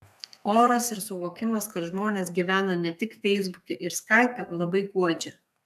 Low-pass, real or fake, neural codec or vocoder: 14.4 kHz; fake; codec, 32 kHz, 1.9 kbps, SNAC